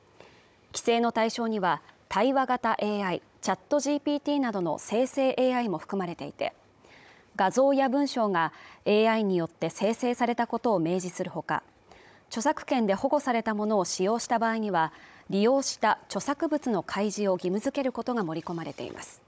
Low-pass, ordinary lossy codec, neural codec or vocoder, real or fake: none; none; codec, 16 kHz, 16 kbps, FunCodec, trained on Chinese and English, 50 frames a second; fake